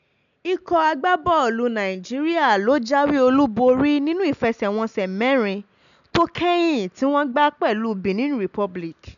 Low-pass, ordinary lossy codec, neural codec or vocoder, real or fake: 7.2 kHz; none; none; real